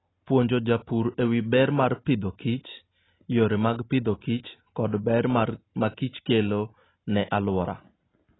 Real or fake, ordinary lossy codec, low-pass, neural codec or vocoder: real; AAC, 16 kbps; 7.2 kHz; none